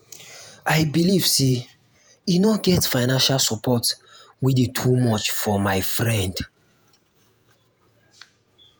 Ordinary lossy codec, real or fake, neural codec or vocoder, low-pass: none; fake; vocoder, 48 kHz, 128 mel bands, Vocos; none